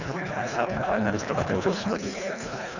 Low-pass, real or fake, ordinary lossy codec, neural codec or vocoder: 7.2 kHz; fake; none; codec, 24 kHz, 1.5 kbps, HILCodec